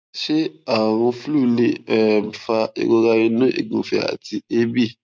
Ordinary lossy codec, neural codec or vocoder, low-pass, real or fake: none; none; none; real